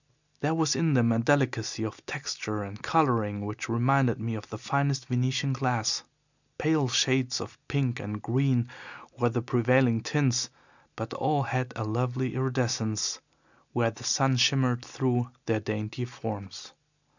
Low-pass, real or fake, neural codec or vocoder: 7.2 kHz; real; none